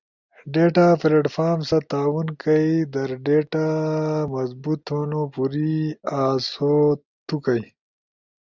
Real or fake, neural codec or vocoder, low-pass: real; none; 7.2 kHz